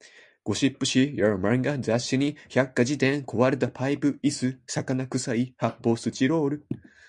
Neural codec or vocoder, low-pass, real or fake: none; 10.8 kHz; real